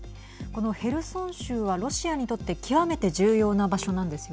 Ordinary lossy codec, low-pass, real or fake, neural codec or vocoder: none; none; real; none